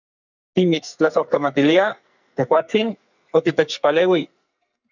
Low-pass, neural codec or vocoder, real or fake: 7.2 kHz; codec, 44.1 kHz, 2.6 kbps, SNAC; fake